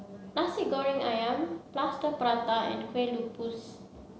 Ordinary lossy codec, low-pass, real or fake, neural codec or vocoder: none; none; real; none